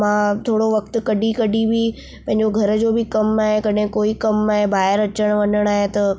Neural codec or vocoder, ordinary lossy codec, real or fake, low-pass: none; none; real; none